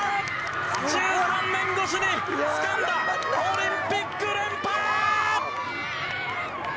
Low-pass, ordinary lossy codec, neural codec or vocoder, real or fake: none; none; none; real